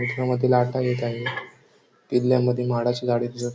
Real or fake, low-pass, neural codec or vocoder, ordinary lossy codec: real; none; none; none